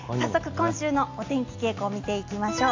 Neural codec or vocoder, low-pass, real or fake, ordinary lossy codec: none; 7.2 kHz; real; AAC, 48 kbps